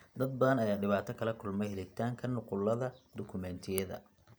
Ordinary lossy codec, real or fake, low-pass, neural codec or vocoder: none; real; none; none